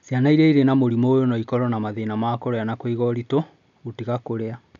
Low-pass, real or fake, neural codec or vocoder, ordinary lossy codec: 7.2 kHz; real; none; none